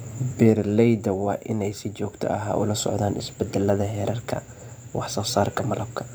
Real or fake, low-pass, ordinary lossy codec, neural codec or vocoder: fake; none; none; vocoder, 44.1 kHz, 128 mel bands every 512 samples, BigVGAN v2